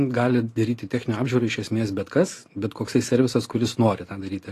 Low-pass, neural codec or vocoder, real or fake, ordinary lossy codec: 14.4 kHz; none; real; AAC, 48 kbps